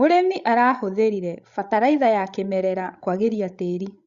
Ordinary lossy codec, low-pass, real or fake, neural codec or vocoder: Opus, 64 kbps; 7.2 kHz; fake; codec, 16 kHz, 16 kbps, FreqCodec, larger model